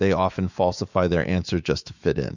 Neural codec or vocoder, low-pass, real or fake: autoencoder, 48 kHz, 128 numbers a frame, DAC-VAE, trained on Japanese speech; 7.2 kHz; fake